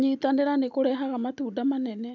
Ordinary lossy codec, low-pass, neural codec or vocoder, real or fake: none; 7.2 kHz; none; real